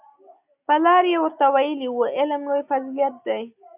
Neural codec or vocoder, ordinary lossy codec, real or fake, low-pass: none; AAC, 32 kbps; real; 3.6 kHz